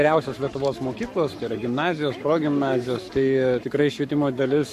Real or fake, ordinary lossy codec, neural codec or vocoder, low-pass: fake; MP3, 64 kbps; codec, 44.1 kHz, 7.8 kbps, Pupu-Codec; 14.4 kHz